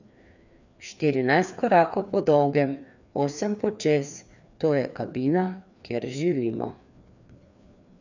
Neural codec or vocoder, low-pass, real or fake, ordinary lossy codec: codec, 16 kHz, 2 kbps, FreqCodec, larger model; 7.2 kHz; fake; none